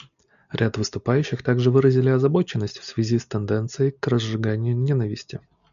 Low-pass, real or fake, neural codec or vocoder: 7.2 kHz; real; none